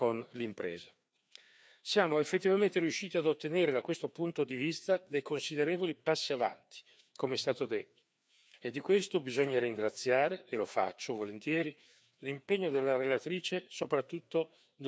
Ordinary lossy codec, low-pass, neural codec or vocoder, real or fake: none; none; codec, 16 kHz, 2 kbps, FreqCodec, larger model; fake